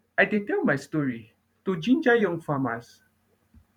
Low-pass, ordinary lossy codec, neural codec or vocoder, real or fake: 19.8 kHz; none; vocoder, 44.1 kHz, 128 mel bands every 512 samples, BigVGAN v2; fake